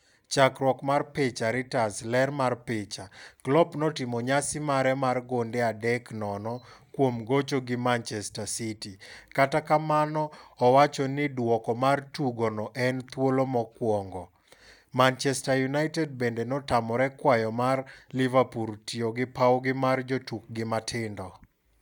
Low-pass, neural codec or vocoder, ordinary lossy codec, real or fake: none; none; none; real